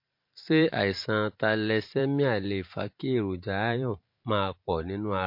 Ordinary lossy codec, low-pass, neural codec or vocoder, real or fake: MP3, 32 kbps; 5.4 kHz; vocoder, 44.1 kHz, 128 mel bands every 512 samples, BigVGAN v2; fake